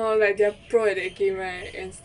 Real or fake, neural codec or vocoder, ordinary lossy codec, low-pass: real; none; none; 10.8 kHz